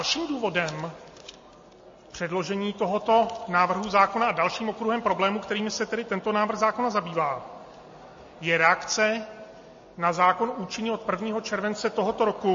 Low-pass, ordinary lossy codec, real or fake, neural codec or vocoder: 7.2 kHz; MP3, 32 kbps; real; none